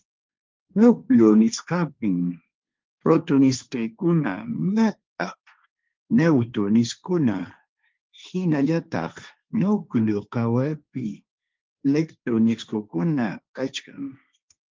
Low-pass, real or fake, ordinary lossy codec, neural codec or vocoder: 7.2 kHz; fake; Opus, 24 kbps; codec, 16 kHz, 1.1 kbps, Voila-Tokenizer